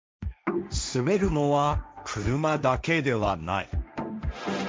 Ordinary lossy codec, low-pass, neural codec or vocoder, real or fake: none; none; codec, 16 kHz, 1.1 kbps, Voila-Tokenizer; fake